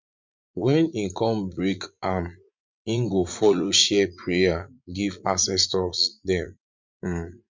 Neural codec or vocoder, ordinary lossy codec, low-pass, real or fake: vocoder, 44.1 kHz, 80 mel bands, Vocos; MP3, 64 kbps; 7.2 kHz; fake